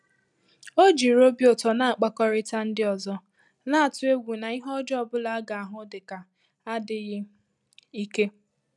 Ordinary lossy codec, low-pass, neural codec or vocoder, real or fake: none; 10.8 kHz; none; real